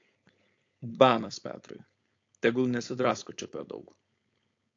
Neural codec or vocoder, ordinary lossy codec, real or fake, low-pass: codec, 16 kHz, 4.8 kbps, FACodec; AAC, 48 kbps; fake; 7.2 kHz